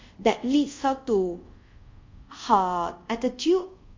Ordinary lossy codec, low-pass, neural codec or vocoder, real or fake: MP3, 48 kbps; 7.2 kHz; codec, 24 kHz, 0.5 kbps, DualCodec; fake